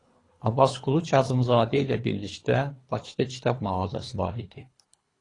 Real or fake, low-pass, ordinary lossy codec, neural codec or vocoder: fake; 10.8 kHz; AAC, 32 kbps; codec, 24 kHz, 3 kbps, HILCodec